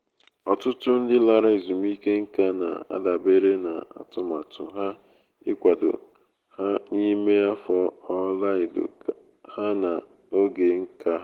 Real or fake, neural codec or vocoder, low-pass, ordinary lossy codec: real; none; 19.8 kHz; Opus, 16 kbps